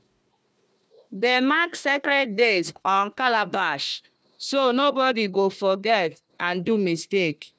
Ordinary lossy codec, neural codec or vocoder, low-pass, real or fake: none; codec, 16 kHz, 1 kbps, FunCodec, trained on Chinese and English, 50 frames a second; none; fake